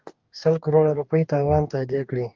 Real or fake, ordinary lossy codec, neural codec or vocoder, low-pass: fake; Opus, 24 kbps; codec, 44.1 kHz, 2.6 kbps, DAC; 7.2 kHz